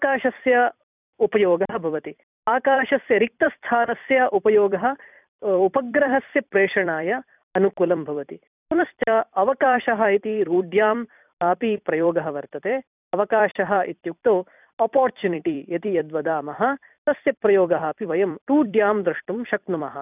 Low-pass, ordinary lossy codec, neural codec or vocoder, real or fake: 3.6 kHz; none; vocoder, 44.1 kHz, 128 mel bands every 256 samples, BigVGAN v2; fake